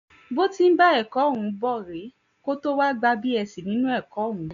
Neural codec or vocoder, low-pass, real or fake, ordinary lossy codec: none; 7.2 kHz; real; none